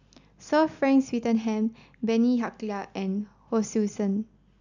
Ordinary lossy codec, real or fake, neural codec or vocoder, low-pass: none; real; none; 7.2 kHz